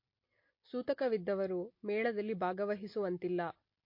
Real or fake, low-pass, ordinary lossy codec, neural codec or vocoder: real; 5.4 kHz; MP3, 32 kbps; none